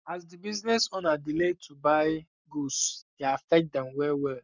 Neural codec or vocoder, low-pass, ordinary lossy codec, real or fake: codec, 44.1 kHz, 7.8 kbps, Pupu-Codec; 7.2 kHz; none; fake